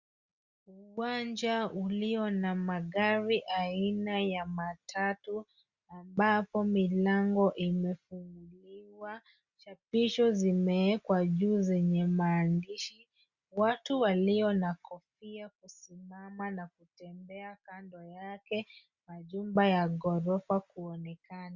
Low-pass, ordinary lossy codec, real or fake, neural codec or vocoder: 7.2 kHz; Opus, 64 kbps; real; none